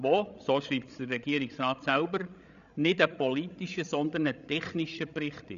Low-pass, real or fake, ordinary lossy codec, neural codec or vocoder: 7.2 kHz; fake; none; codec, 16 kHz, 16 kbps, FreqCodec, larger model